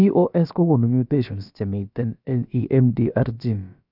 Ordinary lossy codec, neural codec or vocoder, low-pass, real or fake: none; codec, 16 kHz, about 1 kbps, DyCAST, with the encoder's durations; 5.4 kHz; fake